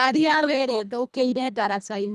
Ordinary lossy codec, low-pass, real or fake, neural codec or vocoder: none; none; fake; codec, 24 kHz, 1.5 kbps, HILCodec